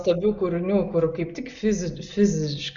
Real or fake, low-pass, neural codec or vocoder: real; 7.2 kHz; none